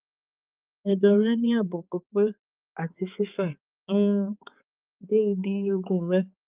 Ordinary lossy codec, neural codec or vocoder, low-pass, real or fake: Opus, 32 kbps; codec, 16 kHz, 4 kbps, X-Codec, HuBERT features, trained on balanced general audio; 3.6 kHz; fake